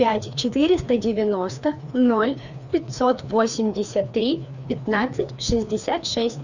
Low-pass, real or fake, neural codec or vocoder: 7.2 kHz; fake; codec, 16 kHz, 2 kbps, FreqCodec, larger model